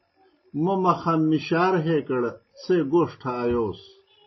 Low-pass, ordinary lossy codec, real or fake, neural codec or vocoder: 7.2 kHz; MP3, 24 kbps; real; none